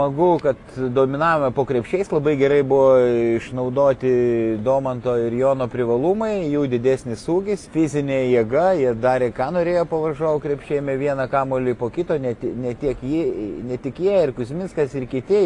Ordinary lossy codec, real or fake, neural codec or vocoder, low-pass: AAC, 48 kbps; real; none; 10.8 kHz